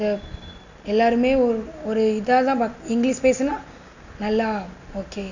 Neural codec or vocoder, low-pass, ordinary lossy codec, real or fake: none; 7.2 kHz; none; real